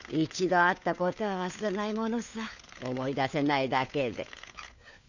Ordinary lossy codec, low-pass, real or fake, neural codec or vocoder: none; 7.2 kHz; fake; codec, 16 kHz, 4 kbps, FunCodec, trained on LibriTTS, 50 frames a second